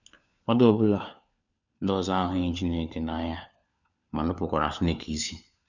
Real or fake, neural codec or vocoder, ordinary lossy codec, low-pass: fake; codec, 16 kHz, 4 kbps, FunCodec, trained on LibriTTS, 50 frames a second; none; 7.2 kHz